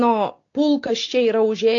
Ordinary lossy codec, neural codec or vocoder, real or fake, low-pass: AAC, 48 kbps; none; real; 7.2 kHz